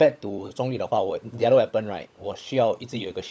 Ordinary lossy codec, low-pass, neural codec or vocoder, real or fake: none; none; codec, 16 kHz, 16 kbps, FunCodec, trained on LibriTTS, 50 frames a second; fake